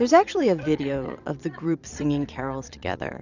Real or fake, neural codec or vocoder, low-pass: fake; vocoder, 22.05 kHz, 80 mel bands, Vocos; 7.2 kHz